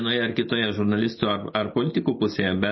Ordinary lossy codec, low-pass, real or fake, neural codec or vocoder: MP3, 24 kbps; 7.2 kHz; fake; vocoder, 44.1 kHz, 128 mel bands every 256 samples, BigVGAN v2